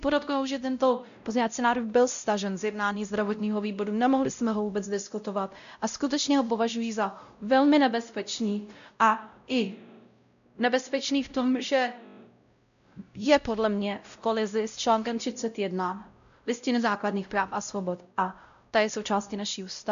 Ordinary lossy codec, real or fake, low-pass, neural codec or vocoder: AAC, 96 kbps; fake; 7.2 kHz; codec, 16 kHz, 0.5 kbps, X-Codec, WavLM features, trained on Multilingual LibriSpeech